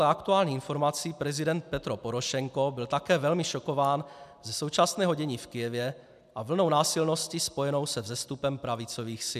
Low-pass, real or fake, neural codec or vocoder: 14.4 kHz; real; none